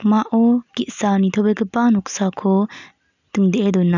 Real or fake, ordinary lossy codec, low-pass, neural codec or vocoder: real; none; 7.2 kHz; none